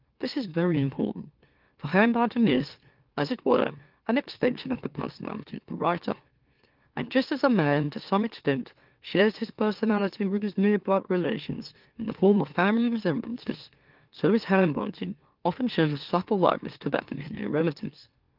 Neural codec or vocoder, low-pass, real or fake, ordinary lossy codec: autoencoder, 44.1 kHz, a latent of 192 numbers a frame, MeloTTS; 5.4 kHz; fake; Opus, 32 kbps